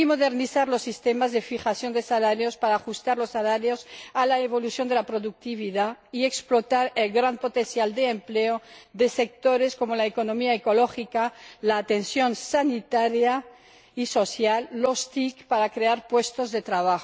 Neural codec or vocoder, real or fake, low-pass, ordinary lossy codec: none; real; none; none